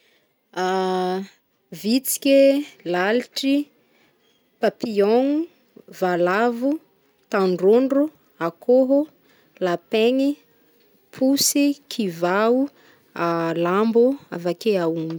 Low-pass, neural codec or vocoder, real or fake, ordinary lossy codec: none; none; real; none